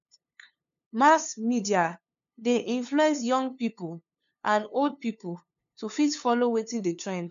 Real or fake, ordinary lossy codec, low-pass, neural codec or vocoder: fake; MP3, 64 kbps; 7.2 kHz; codec, 16 kHz, 2 kbps, FunCodec, trained on LibriTTS, 25 frames a second